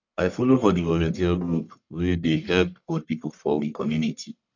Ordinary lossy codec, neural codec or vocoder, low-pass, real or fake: none; codec, 44.1 kHz, 1.7 kbps, Pupu-Codec; 7.2 kHz; fake